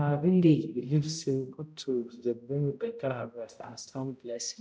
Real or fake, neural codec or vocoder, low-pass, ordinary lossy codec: fake; codec, 16 kHz, 0.5 kbps, X-Codec, HuBERT features, trained on balanced general audio; none; none